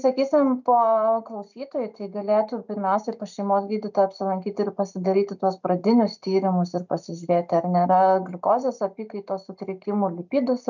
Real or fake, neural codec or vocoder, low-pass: real; none; 7.2 kHz